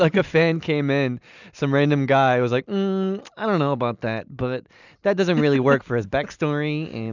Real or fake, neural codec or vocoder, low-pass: real; none; 7.2 kHz